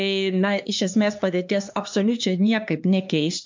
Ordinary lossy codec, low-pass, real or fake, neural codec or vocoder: MP3, 64 kbps; 7.2 kHz; fake; codec, 16 kHz, 2 kbps, X-Codec, HuBERT features, trained on LibriSpeech